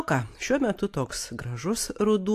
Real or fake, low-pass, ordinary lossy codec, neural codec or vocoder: real; 14.4 kHz; AAC, 64 kbps; none